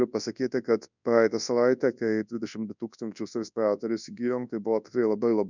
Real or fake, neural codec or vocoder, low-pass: fake; codec, 24 kHz, 0.9 kbps, WavTokenizer, large speech release; 7.2 kHz